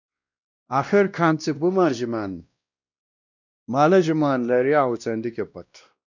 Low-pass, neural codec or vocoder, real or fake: 7.2 kHz; codec, 16 kHz, 1 kbps, X-Codec, WavLM features, trained on Multilingual LibriSpeech; fake